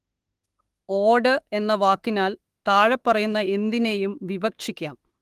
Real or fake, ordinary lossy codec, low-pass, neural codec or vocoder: fake; Opus, 24 kbps; 14.4 kHz; autoencoder, 48 kHz, 32 numbers a frame, DAC-VAE, trained on Japanese speech